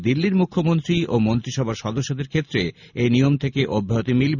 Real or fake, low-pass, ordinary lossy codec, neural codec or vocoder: real; 7.2 kHz; none; none